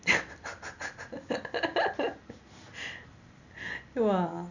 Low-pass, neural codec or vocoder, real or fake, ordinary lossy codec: 7.2 kHz; none; real; none